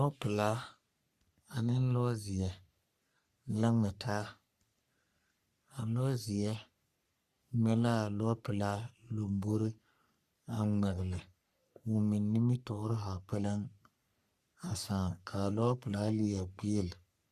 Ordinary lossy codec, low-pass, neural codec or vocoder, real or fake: Opus, 64 kbps; 14.4 kHz; codec, 44.1 kHz, 3.4 kbps, Pupu-Codec; fake